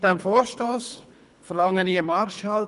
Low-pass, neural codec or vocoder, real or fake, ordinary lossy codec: 10.8 kHz; codec, 24 kHz, 3 kbps, HILCodec; fake; none